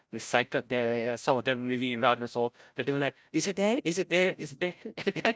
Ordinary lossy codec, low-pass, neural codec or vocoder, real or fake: none; none; codec, 16 kHz, 0.5 kbps, FreqCodec, larger model; fake